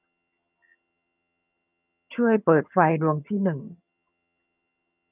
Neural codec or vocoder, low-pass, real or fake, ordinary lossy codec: vocoder, 22.05 kHz, 80 mel bands, HiFi-GAN; 3.6 kHz; fake; none